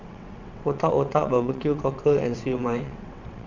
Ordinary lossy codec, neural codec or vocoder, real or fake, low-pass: none; vocoder, 22.05 kHz, 80 mel bands, WaveNeXt; fake; 7.2 kHz